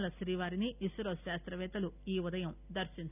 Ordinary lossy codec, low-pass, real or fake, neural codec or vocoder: none; 3.6 kHz; real; none